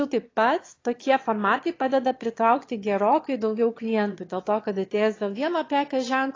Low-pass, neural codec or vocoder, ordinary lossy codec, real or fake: 7.2 kHz; autoencoder, 22.05 kHz, a latent of 192 numbers a frame, VITS, trained on one speaker; AAC, 32 kbps; fake